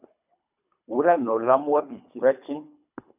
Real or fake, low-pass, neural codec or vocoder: fake; 3.6 kHz; codec, 24 kHz, 3 kbps, HILCodec